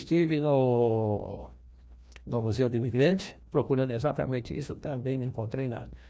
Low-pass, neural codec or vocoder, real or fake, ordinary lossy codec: none; codec, 16 kHz, 1 kbps, FreqCodec, larger model; fake; none